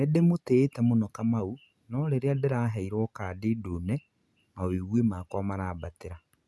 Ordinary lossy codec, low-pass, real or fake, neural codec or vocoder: none; none; real; none